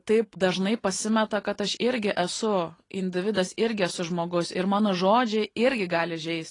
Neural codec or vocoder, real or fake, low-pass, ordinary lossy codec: none; real; 10.8 kHz; AAC, 32 kbps